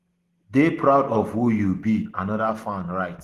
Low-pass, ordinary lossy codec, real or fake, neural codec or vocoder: 14.4 kHz; Opus, 16 kbps; real; none